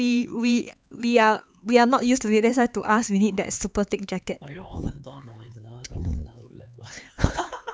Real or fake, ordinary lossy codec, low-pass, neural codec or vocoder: fake; none; none; codec, 16 kHz, 4 kbps, X-Codec, HuBERT features, trained on LibriSpeech